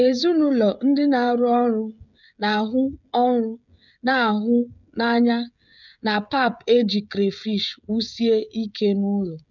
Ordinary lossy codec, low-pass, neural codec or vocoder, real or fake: none; 7.2 kHz; codec, 16 kHz, 16 kbps, FreqCodec, smaller model; fake